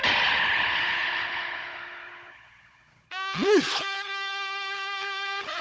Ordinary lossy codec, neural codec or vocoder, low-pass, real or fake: none; codec, 16 kHz, 16 kbps, FunCodec, trained on Chinese and English, 50 frames a second; none; fake